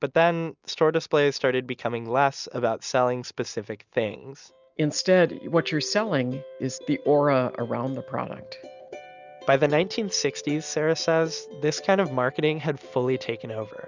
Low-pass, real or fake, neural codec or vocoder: 7.2 kHz; real; none